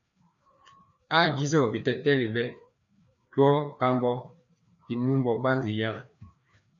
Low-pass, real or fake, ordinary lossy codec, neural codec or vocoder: 7.2 kHz; fake; MP3, 96 kbps; codec, 16 kHz, 2 kbps, FreqCodec, larger model